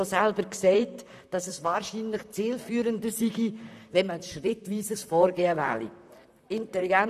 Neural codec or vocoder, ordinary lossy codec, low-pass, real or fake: vocoder, 44.1 kHz, 128 mel bands, Pupu-Vocoder; none; 14.4 kHz; fake